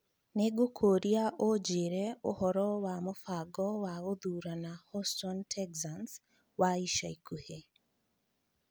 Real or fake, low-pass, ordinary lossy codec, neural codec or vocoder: real; none; none; none